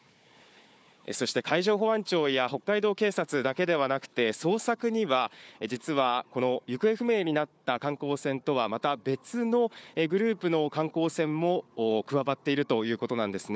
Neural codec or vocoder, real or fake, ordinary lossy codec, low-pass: codec, 16 kHz, 4 kbps, FunCodec, trained on Chinese and English, 50 frames a second; fake; none; none